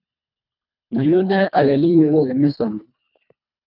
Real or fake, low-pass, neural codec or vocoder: fake; 5.4 kHz; codec, 24 kHz, 1.5 kbps, HILCodec